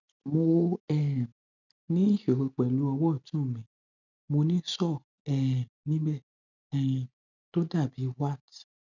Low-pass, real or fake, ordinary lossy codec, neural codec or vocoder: 7.2 kHz; real; none; none